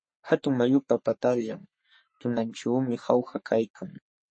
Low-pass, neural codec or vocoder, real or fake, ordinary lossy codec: 9.9 kHz; codec, 44.1 kHz, 3.4 kbps, Pupu-Codec; fake; MP3, 32 kbps